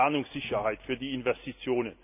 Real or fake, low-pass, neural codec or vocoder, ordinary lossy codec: real; 3.6 kHz; none; none